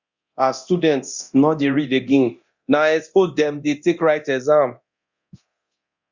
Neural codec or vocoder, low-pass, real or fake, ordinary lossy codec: codec, 24 kHz, 0.9 kbps, DualCodec; 7.2 kHz; fake; Opus, 64 kbps